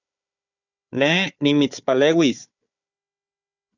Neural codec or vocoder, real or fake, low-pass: codec, 16 kHz, 4 kbps, FunCodec, trained on Chinese and English, 50 frames a second; fake; 7.2 kHz